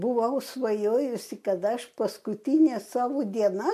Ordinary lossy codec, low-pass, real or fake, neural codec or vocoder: MP3, 64 kbps; 14.4 kHz; real; none